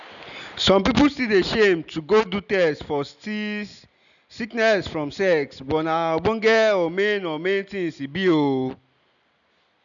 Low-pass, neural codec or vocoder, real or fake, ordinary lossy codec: 7.2 kHz; none; real; none